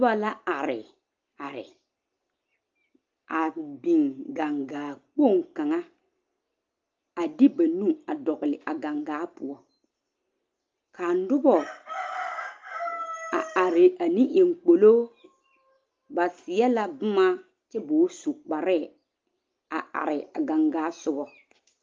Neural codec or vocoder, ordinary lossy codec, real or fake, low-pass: none; Opus, 24 kbps; real; 7.2 kHz